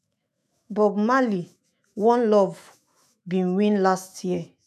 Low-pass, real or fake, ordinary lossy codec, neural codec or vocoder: 14.4 kHz; fake; none; autoencoder, 48 kHz, 128 numbers a frame, DAC-VAE, trained on Japanese speech